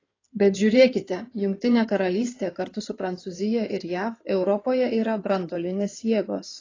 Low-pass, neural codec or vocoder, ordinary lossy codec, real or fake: 7.2 kHz; codec, 16 kHz in and 24 kHz out, 2.2 kbps, FireRedTTS-2 codec; AAC, 32 kbps; fake